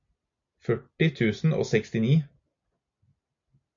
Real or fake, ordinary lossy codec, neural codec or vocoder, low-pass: real; MP3, 64 kbps; none; 7.2 kHz